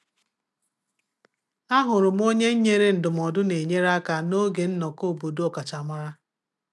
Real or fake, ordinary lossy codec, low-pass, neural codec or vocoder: real; none; none; none